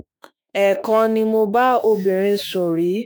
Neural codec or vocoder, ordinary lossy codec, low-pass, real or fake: autoencoder, 48 kHz, 32 numbers a frame, DAC-VAE, trained on Japanese speech; none; none; fake